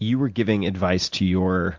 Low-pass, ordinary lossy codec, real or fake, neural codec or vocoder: 7.2 kHz; MP3, 64 kbps; real; none